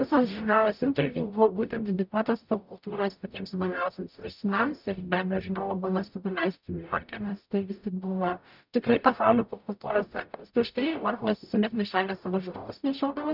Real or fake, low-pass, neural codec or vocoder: fake; 5.4 kHz; codec, 44.1 kHz, 0.9 kbps, DAC